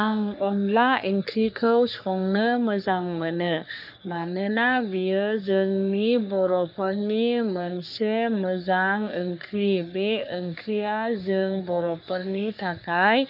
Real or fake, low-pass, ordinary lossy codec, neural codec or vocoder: fake; 5.4 kHz; none; codec, 44.1 kHz, 3.4 kbps, Pupu-Codec